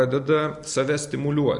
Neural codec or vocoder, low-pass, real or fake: none; 10.8 kHz; real